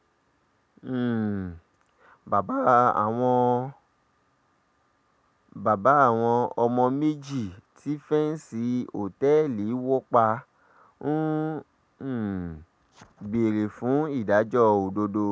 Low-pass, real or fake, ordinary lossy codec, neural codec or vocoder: none; real; none; none